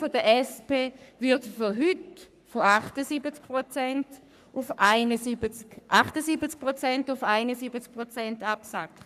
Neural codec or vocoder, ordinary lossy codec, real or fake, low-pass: codec, 44.1 kHz, 3.4 kbps, Pupu-Codec; none; fake; 14.4 kHz